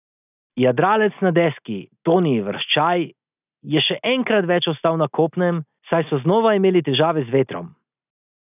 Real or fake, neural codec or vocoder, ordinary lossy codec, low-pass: real; none; none; 3.6 kHz